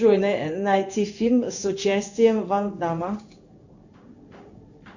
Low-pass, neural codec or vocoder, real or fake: 7.2 kHz; codec, 16 kHz in and 24 kHz out, 1 kbps, XY-Tokenizer; fake